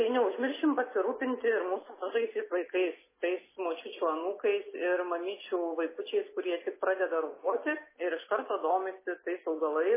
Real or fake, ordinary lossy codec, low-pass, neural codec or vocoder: real; MP3, 16 kbps; 3.6 kHz; none